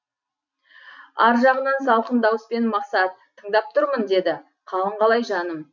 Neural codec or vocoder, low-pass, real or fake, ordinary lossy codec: none; 7.2 kHz; real; none